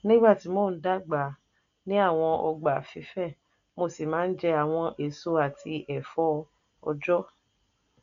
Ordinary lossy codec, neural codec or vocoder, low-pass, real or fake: none; none; 7.2 kHz; real